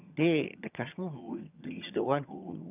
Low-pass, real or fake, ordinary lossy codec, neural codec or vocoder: 3.6 kHz; fake; none; vocoder, 22.05 kHz, 80 mel bands, HiFi-GAN